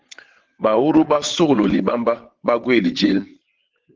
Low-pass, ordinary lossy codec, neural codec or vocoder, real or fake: 7.2 kHz; Opus, 16 kbps; vocoder, 22.05 kHz, 80 mel bands, WaveNeXt; fake